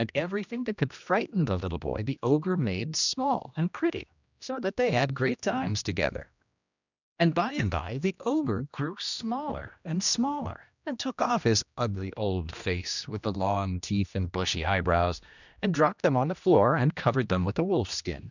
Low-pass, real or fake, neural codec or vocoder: 7.2 kHz; fake; codec, 16 kHz, 1 kbps, X-Codec, HuBERT features, trained on general audio